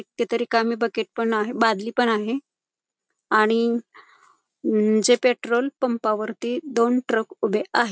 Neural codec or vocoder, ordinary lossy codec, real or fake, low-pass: none; none; real; none